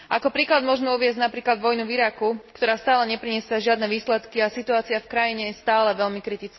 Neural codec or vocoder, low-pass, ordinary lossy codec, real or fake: none; 7.2 kHz; MP3, 24 kbps; real